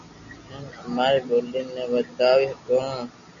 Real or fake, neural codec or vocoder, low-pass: real; none; 7.2 kHz